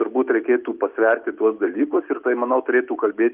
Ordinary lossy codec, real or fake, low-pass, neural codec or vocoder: Opus, 24 kbps; real; 3.6 kHz; none